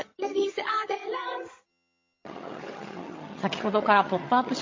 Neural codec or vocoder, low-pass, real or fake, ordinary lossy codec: vocoder, 22.05 kHz, 80 mel bands, HiFi-GAN; 7.2 kHz; fake; MP3, 32 kbps